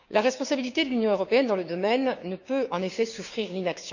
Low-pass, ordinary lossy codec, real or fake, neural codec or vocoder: 7.2 kHz; none; fake; codec, 16 kHz, 6 kbps, DAC